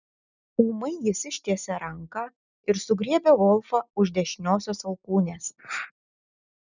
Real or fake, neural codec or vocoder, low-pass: real; none; 7.2 kHz